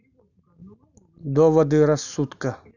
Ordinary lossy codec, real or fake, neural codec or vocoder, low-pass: none; real; none; 7.2 kHz